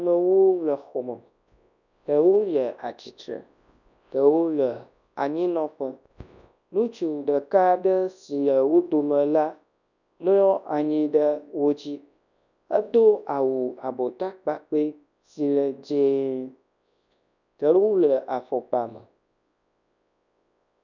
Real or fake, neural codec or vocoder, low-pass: fake; codec, 24 kHz, 0.9 kbps, WavTokenizer, large speech release; 7.2 kHz